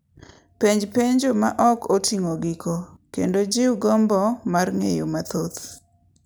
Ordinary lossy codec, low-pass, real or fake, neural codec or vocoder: none; none; real; none